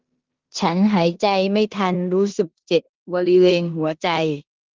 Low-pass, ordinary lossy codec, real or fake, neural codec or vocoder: 7.2 kHz; Opus, 16 kbps; fake; codec, 16 kHz in and 24 kHz out, 0.9 kbps, LongCat-Audio-Codec, four codebook decoder